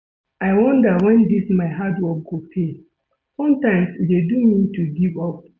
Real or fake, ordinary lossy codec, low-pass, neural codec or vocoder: real; none; none; none